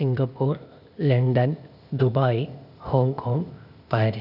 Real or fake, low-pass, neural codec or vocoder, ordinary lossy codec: fake; 5.4 kHz; codec, 16 kHz, 0.8 kbps, ZipCodec; none